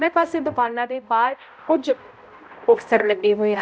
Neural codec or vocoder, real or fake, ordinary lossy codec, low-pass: codec, 16 kHz, 0.5 kbps, X-Codec, HuBERT features, trained on balanced general audio; fake; none; none